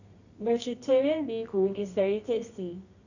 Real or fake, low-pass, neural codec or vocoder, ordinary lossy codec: fake; 7.2 kHz; codec, 24 kHz, 0.9 kbps, WavTokenizer, medium music audio release; none